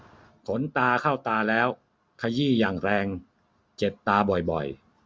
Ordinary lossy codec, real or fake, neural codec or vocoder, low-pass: none; real; none; none